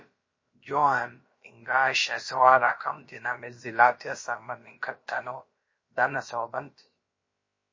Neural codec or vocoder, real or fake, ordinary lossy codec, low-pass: codec, 16 kHz, about 1 kbps, DyCAST, with the encoder's durations; fake; MP3, 32 kbps; 7.2 kHz